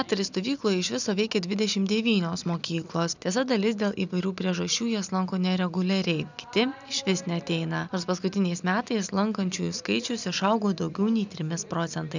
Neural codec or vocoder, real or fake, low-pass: none; real; 7.2 kHz